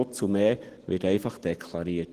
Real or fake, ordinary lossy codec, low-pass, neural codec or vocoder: real; Opus, 16 kbps; 14.4 kHz; none